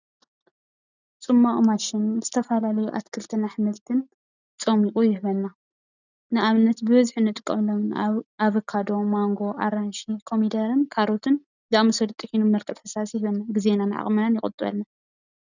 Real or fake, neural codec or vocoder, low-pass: real; none; 7.2 kHz